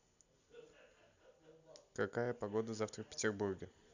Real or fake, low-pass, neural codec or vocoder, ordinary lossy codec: real; 7.2 kHz; none; none